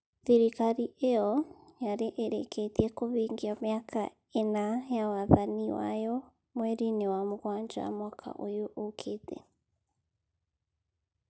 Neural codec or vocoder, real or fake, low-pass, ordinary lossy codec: none; real; none; none